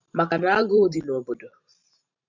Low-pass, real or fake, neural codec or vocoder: 7.2 kHz; fake; vocoder, 44.1 kHz, 128 mel bands every 512 samples, BigVGAN v2